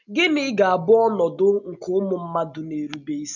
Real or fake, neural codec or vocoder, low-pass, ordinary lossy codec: real; none; 7.2 kHz; none